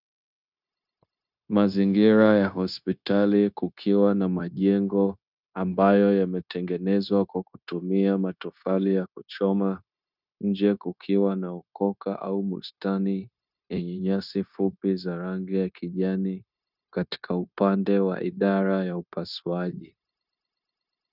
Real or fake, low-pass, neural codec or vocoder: fake; 5.4 kHz; codec, 16 kHz, 0.9 kbps, LongCat-Audio-Codec